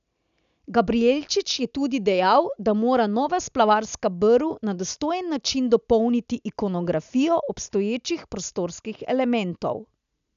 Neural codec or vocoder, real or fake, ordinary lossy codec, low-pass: none; real; none; 7.2 kHz